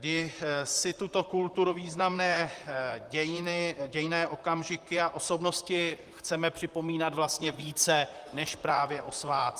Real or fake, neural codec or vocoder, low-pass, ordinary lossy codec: fake; vocoder, 44.1 kHz, 128 mel bands, Pupu-Vocoder; 14.4 kHz; Opus, 32 kbps